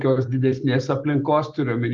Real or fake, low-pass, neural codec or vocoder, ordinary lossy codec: real; 7.2 kHz; none; Opus, 32 kbps